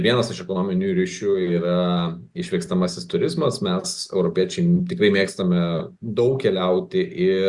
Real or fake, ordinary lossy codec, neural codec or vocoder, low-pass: real; Opus, 64 kbps; none; 10.8 kHz